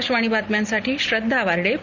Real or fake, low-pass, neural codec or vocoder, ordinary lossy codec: real; 7.2 kHz; none; none